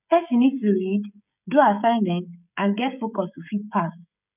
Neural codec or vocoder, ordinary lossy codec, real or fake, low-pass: codec, 16 kHz, 16 kbps, FreqCodec, smaller model; none; fake; 3.6 kHz